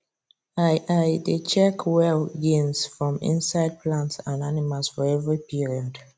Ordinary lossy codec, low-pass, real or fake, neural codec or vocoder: none; none; real; none